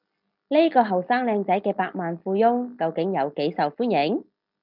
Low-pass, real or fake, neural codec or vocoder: 5.4 kHz; real; none